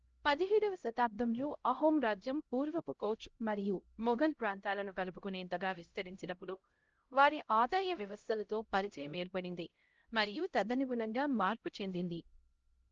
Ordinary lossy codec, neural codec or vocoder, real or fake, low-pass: Opus, 16 kbps; codec, 16 kHz, 0.5 kbps, X-Codec, HuBERT features, trained on LibriSpeech; fake; 7.2 kHz